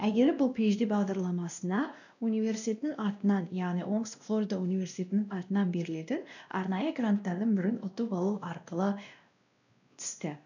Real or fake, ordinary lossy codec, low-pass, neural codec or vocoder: fake; none; 7.2 kHz; codec, 16 kHz, 1 kbps, X-Codec, WavLM features, trained on Multilingual LibriSpeech